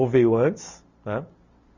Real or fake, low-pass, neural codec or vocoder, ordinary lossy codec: real; 7.2 kHz; none; none